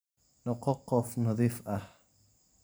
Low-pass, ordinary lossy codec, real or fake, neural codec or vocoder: none; none; fake; vocoder, 44.1 kHz, 128 mel bands every 512 samples, BigVGAN v2